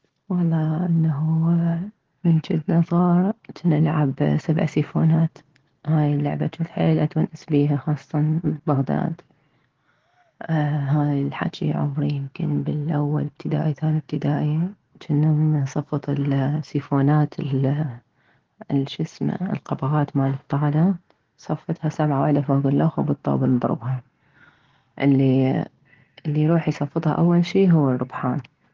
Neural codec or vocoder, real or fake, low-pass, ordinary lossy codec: none; real; 7.2 kHz; Opus, 16 kbps